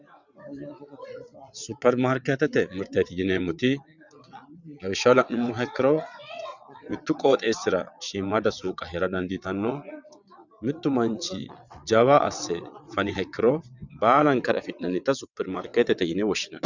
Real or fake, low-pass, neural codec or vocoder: fake; 7.2 kHz; vocoder, 22.05 kHz, 80 mel bands, WaveNeXt